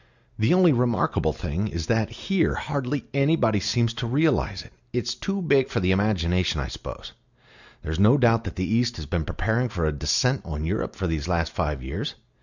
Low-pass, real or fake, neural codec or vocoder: 7.2 kHz; real; none